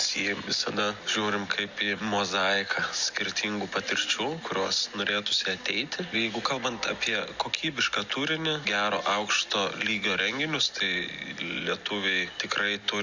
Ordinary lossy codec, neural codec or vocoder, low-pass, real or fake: Opus, 64 kbps; none; 7.2 kHz; real